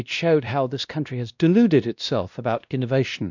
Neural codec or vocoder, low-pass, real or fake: codec, 16 kHz, 1 kbps, X-Codec, WavLM features, trained on Multilingual LibriSpeech; 7.2 kHz; fake